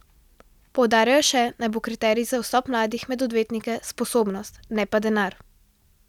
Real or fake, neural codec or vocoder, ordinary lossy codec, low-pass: real; none; none; 19.8 kHz